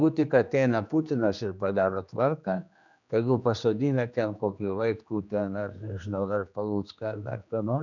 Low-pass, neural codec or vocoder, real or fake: 7.2 kHz; codec, 16 kHz, 2 kbps, X-Codec, HuBERT features, trained on general audio; fake